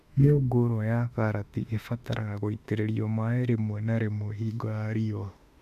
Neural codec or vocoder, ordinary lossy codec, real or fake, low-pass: autoencoder, 48 kHz, 32 numbers a frame, DAC-VAE, trained on Japanese speech; none; fake; 14.4 kHz